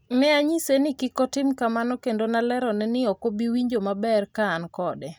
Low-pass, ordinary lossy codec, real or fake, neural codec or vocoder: none; none; real; none